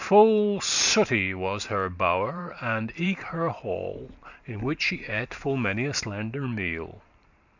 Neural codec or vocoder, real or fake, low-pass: vocoder, 44.1 kHz, 128 mel bands every 512 samples, BigVGAN v2; fake; 7.2 kHz